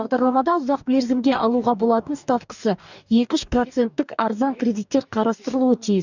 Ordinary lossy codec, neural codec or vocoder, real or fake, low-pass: none; codec, 44.1 kHz, 2.6 kbps, DAC; fake; 7.2 kHz